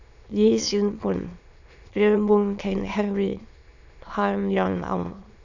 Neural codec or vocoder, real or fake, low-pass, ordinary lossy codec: autoencoder, 22.05 kHz, a latent of 192 numbers a frame, VITS, trained on many speakers; fake; 7.2 kHz; none